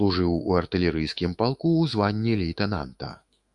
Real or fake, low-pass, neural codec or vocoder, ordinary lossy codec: fake; 10.8 kHz; autoencoder, 48 kHz, 128 numbers a frame, DAC-VAE, trained on Japanese speech; Opus, 64 kbps